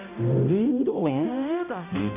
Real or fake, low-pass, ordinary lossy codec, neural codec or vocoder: fake; 3.6 kHz; none; codec, 16 kHz, 0.5 kbps, X-Codec, HuBERT features, trained on balanced general audio